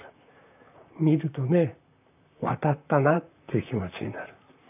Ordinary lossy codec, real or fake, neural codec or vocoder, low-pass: none; fake; vocoder, 44.1 kHz, 128 mel bands, Pupu-Vocoder; 3.6 kHz